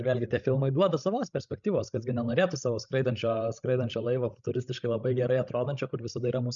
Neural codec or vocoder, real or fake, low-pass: codec, 16 kHz, 16 kbps, FreqCodec, larger model; fake; 7.2 kHz